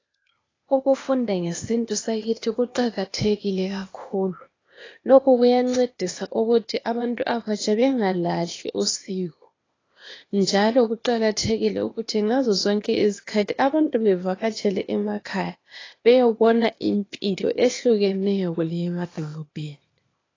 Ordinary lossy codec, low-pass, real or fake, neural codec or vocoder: AAC, 32 kbps; 7.2 kHz; fake; codec, 16 kHz, 0.8 kbps, ZipCodec